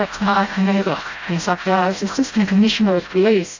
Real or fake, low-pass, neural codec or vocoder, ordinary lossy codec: fake; 7.2 kHz; codec, 16 kHz, 0.5 kbps, FreqCodec, smaller model; AAC, 48 kbps